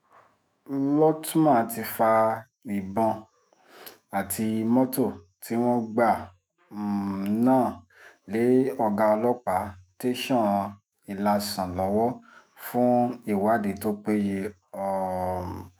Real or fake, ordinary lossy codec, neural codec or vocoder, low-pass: fake; none; autoencoder, 48 kHz, 128 numbers a frame, DAC-VAE, trained on Japanese speech; none